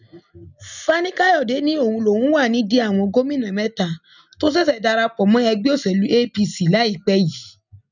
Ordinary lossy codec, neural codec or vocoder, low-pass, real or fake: none; none; 7.2 kHz; real